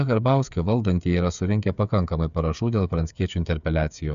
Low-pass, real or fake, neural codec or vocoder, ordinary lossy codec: 7.2 kHz; fake; codec, 16 kHz, 8 kbps, FreqCodec, smaller model; AAC, 96 kbps